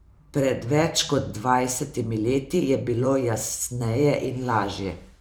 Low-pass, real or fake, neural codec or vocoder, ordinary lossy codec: none; fake; vocoder, 44.1 kHz, 128 mel bands every 512 samples, BigVGAN v2; none